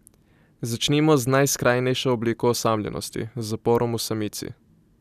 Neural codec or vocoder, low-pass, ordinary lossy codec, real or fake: none; 14.4 kHz; none; real